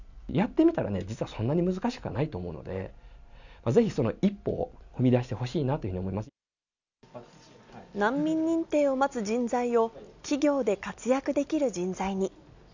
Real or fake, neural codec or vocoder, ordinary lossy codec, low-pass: real; none; none; 7.2 kHz